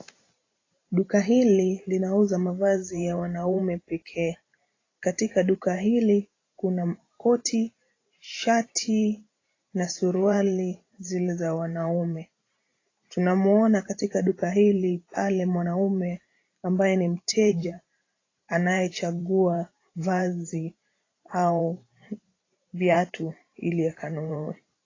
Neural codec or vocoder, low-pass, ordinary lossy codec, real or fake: vocoder, 44.1 kHz, 128 mel bands every 512 samples, BigVGAN v2; 7.2 kHz; AAC, 32 kbps; fake